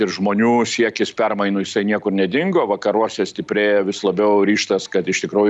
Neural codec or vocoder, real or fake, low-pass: none; real; 10.8 kHz